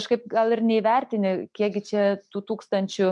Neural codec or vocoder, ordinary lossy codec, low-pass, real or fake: none; MP3, 64 kbps; 10.8 kHz; real